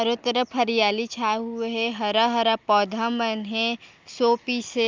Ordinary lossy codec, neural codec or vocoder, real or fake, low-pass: Opus, 24 kbps; none; real; 7.2 kHz